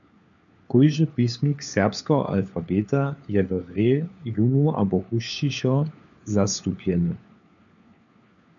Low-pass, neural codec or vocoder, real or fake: 7.2 kHz; codec, 16 kHz, 4 kbps, FunCodec, trained on LibriTTS, 50 frames a second; fake